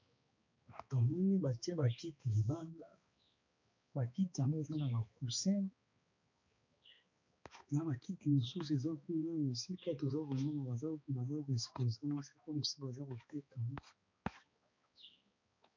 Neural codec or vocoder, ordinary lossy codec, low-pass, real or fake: codec, 16 kHz, 2 kbps, X-Codec, HuBERT features, trained on balanced general audio; MP3, 48 kbps; 7.2 kHz; fake